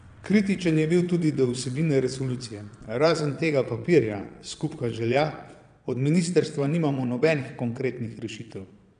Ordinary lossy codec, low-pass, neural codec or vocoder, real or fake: none; 9.9 kHz; vocoder, 22.05 kHz, 80 mel bands, Vocos; fake